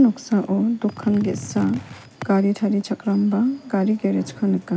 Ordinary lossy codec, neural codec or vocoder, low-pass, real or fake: none; none; none; real